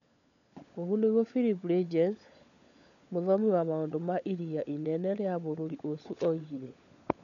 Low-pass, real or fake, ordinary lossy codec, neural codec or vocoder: 7.2 kHz; fake; none; codec, 16 kHz, 16 kbps, FunCodec, trained on LibriTTS, 50 frames a second